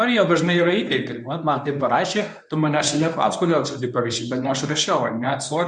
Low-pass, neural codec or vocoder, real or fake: 10.8 kHz; codec, 24 kHz, 0.9 kbps, WavTokenizer, medium speech release version 1; fake